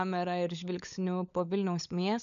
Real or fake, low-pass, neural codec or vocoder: fake; 7.2 kHz; codec, 16 kHz, 16 kbps, FunCodec, trained on LibriTTS, 50 frames a second